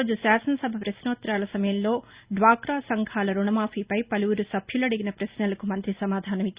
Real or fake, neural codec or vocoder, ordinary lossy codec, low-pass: real; none; Opus, 64 kbps; 3.6 kHz